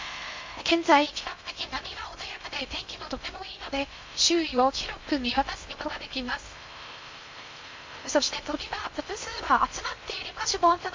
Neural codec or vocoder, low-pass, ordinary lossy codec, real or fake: codec, 16 kHz in and 24 kHz out, 0.6 kbps, FocalCodec, streaming, 4096 codes; 7.2 kHz; MP3, 48 kbps; fake